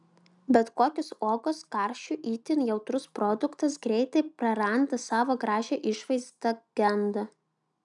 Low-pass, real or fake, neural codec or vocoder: 10.8 kHz; real; none